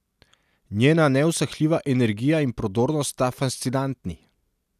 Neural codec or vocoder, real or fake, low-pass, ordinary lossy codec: none; real; 14.4 kHz; none